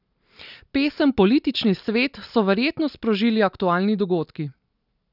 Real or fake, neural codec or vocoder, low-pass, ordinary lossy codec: real; none; 5.4 kHz; none